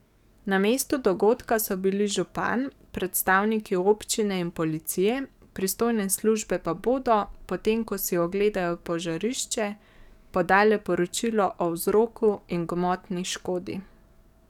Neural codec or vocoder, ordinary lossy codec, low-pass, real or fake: codec, 44.1 kHz, 7.8 kbps, DAC; none; 19.8 kHz; fake